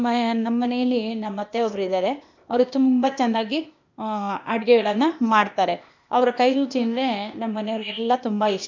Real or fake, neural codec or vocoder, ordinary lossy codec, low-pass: fake; codec, 16 kHz, 0.8 kbps, ZipCodec; MP3, 64 kbps; 7.2 kHz